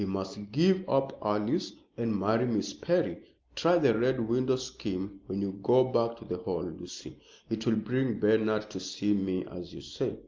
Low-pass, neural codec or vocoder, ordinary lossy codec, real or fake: 7.2 kHz; none; Opus, 24 kbps; real